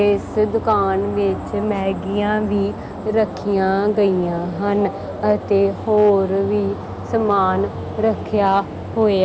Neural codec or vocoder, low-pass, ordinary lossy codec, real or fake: none; none; none; real